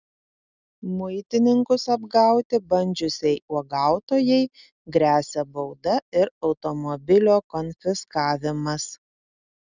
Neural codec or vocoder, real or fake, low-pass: none; real; 7.2 kHz